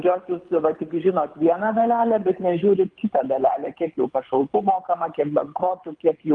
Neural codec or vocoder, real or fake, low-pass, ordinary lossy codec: codec, 24 kHz, 6 kbps, HILCodec; fake; 9.9 kHz; Opus, 32 kbps